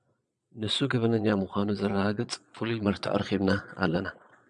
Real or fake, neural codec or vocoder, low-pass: fake; vocoder, 22.05 kHz, 80 mel bands, Vocos; 9.9 kHz